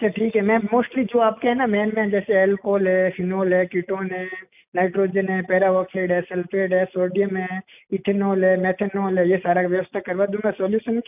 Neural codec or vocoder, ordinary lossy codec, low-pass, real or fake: none; none; 3.6 kHz; real